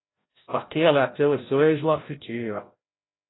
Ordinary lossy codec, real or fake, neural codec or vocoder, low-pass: AAC, 16 kbps; fake; codec, 16 kHz, 0.5 kbps, FreqCodec, larger model; 7.2 kHz